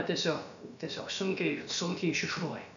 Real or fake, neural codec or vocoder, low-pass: fake; codec, 16 kHz, about 1 kbps, DyCAST, with the encoder's durations; 7.2 kHz